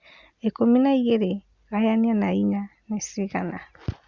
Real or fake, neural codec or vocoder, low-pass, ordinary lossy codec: real; none; 7.2 kHz; Opus, 64 kbps